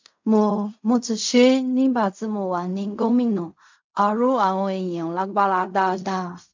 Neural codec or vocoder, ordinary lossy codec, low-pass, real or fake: codec, 16 kHz in and 24 kHz out, 0.4 kbps, LongCat-Audio-Codec, fine tuned four codebook decoder; MP3, 64 kbps; 7.2 kHz; fake